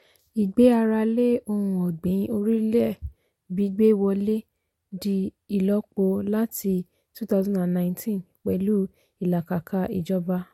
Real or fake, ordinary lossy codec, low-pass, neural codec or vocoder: real; MP3, 64 kbps; 19.8 kHz; none